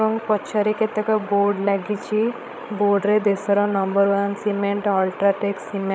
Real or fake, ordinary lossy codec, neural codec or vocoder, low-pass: fake; none; codec, 16 kHz, 16 kbps, FreqCodec, larger model; none